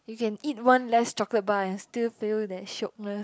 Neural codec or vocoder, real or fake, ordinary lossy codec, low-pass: none; real; none; none